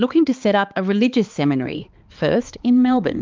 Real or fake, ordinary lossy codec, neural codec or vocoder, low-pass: fake; Opus, 24 kbps; codec, 16 kHz, 4 kbps, X-Codec, HuBERT features, trained on balanced general audio; 7.2 kHz